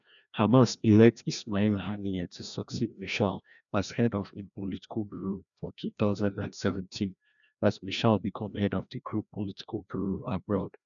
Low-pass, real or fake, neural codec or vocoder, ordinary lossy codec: 7.2 kHz; fake; codec, 16 kHz, 1 kbps, FreqCodec, larger model; none